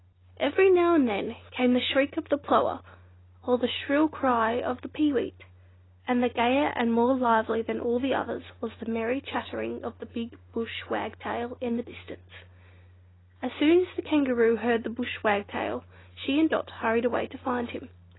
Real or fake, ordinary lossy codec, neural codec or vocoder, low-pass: real; AAC, 16 kbps; none; 7.2 kHz